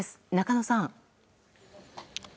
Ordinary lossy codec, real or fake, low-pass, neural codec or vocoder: none; real; none; none